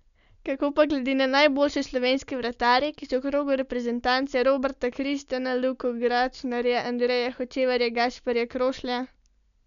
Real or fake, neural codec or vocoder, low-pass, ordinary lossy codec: real; none; 7.2 kHz; MP3, 96 kbps